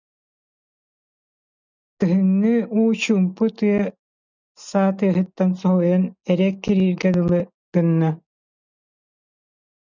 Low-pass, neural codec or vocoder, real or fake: 7.2 kHz; none; real